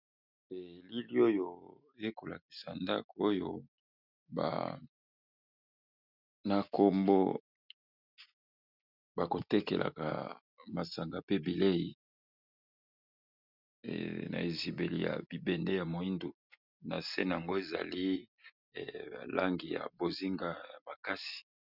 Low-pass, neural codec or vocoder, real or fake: 5.4 kHz; none; real